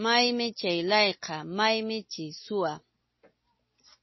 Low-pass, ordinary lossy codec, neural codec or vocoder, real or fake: 7.2 kHz; MP3, 24 kbps; none; real